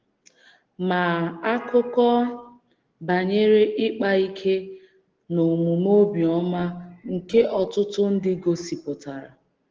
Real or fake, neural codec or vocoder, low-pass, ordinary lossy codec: real; none; 7.2 kHz; Opus, 16 kbps